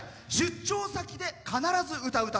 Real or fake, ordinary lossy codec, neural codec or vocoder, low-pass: real; none; none; none